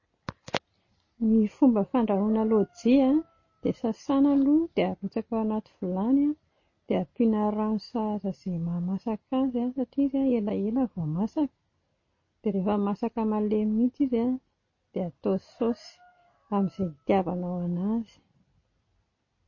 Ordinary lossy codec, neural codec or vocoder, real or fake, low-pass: MP3, 32 kbps; none; real; 7.2 kHz